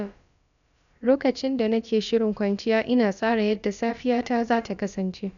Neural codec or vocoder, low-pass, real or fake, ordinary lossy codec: codec, 16 kHz, about 1 kbps, DyCAST, with the encoder's durations; 7.2 kHz; fake; none